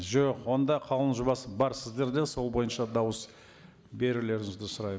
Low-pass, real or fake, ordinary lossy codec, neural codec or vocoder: none; real; none; none